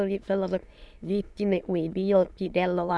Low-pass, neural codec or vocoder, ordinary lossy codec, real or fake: none; autoencoder, 22.05 kHz, a latent of 192 numbers a frame, VITS, trained on many speakers; none; fake